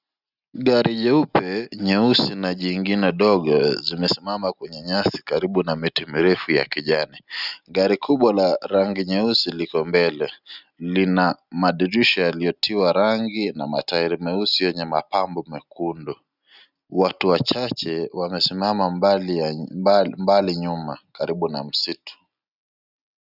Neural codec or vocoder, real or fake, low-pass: none; real; 5.4 kHz